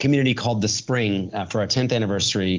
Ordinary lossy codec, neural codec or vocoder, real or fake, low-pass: Opus, 32 kbps; none; real; 7.2 kHz